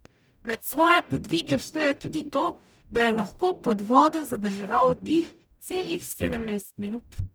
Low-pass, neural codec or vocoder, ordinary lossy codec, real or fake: none; codec, 44.1 kHz, 0.9 kbps, DAC; none; fake